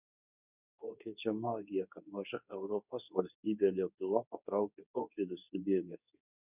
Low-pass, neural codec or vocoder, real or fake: 3.6 kHz; codec, 24 kHz, 0.9 kbps, WavTokenizer, medium speech release version 2; fake